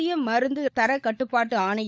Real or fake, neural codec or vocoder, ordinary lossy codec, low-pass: fake; codec, 16 kHz, 16 kbps, FunCodec, trained on LibriTTS, 50 frames a second; none; none